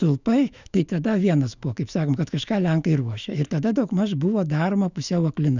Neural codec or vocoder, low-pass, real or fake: autoencoder, 48 kHz, 128 numbers a frame, DAC-VAE, trained on Japanese speech; 7.2 kHz; fake